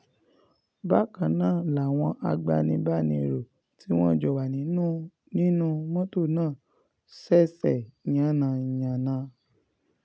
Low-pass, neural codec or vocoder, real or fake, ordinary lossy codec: none; none; real; none